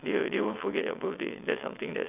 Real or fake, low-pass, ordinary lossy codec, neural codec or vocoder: fake; 3.6 kHz; none; vocoder, 22.05 kHz, 80 mel bands, WaveNeXt